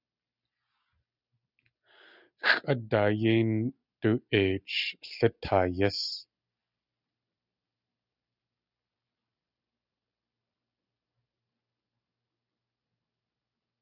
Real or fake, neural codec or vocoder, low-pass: real; none; 5.4 kHz